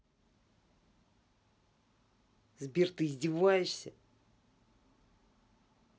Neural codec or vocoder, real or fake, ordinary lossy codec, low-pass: none; real; none; none